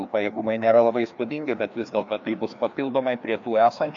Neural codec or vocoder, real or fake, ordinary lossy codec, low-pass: codec, 16 kHz, 2 kbps, FreqCodec, larger model; fake; AAC, 64 kbps; 7.2 kHz